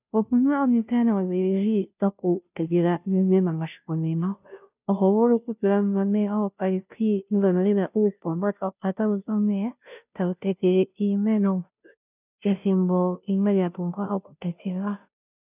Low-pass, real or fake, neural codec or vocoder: 3.6 kHz; fake; codec, 16 kHz, 0.5 kbps, FunCodec, trained on Chinese and English, 25 frames a second